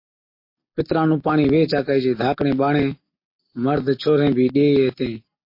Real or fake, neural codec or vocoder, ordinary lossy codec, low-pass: real; none; MP3, 32 kbps; 5.4 kHz